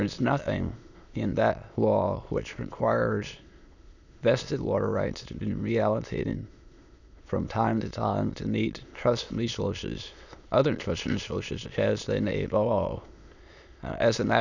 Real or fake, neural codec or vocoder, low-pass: fake; autoencoder, 22.05 kHz, a latent of 192 numbers a frame, VITS, trained on many speakers; 7.2 kHz